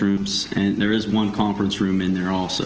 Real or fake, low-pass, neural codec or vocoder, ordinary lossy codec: real; 7.2 kHz; none; Opus, 16 kbps